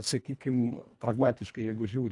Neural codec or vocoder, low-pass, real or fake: codec, 24 kHz, 1.5 kbps, HILCodec; 10.8 kHz; fake